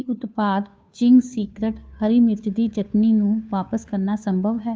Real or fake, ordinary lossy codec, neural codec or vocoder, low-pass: fake; none; codec, 16 kHz, 2 kbps, FunCodec, trained on Chinese and English, 25 frames a second; none